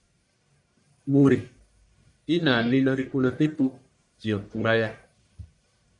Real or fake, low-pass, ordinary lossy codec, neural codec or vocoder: fake; 10.8 kHz; MP3, 96 kbps; codec, 44.1 kHz, 1.7 kbps, Pupu-Codec